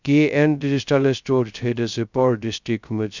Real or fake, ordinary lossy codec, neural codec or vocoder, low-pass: fake; none; codec, 16 kHz, 0.2 kbps, FocalCodec; 7.2 kHz